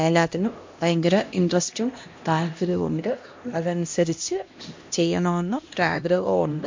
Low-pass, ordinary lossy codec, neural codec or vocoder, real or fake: 7.2 kHz; MP3, 48 kbps; codec, 16 kHz, 1 kbps, X-Codec, HuBERT features, trained on LibriSpeech; fake